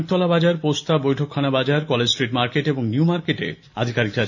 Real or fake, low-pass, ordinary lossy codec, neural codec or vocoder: real; 7.2 kHz; none; none